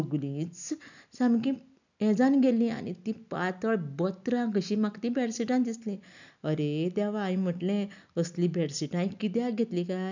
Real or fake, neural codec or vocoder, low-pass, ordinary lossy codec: real; none; 7.2 kHz; none